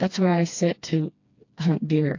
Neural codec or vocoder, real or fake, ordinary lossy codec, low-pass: codec, 16 kHz, 2 kbps, FreqCodec, smaller model; fake; AAC, 48 kbps; 7.2 kHz